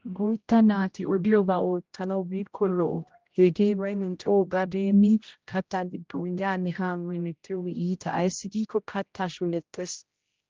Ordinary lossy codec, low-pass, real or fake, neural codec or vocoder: Opus, 32 kbps; 7.2 kHz; fake; codec, 16 kHz, 0.5 kbps, X-Codec, HuBERT features, trained on general audio